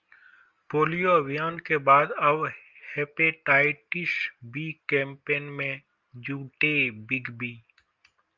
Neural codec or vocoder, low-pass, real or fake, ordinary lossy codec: none; 7.2 kHz; real; Opus, 32 kbps